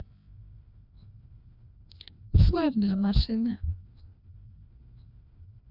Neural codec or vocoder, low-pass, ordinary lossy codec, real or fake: codec, 16 kHz, 1 kbps, FreqCodec, larger model; 5.4 kHz; none; fake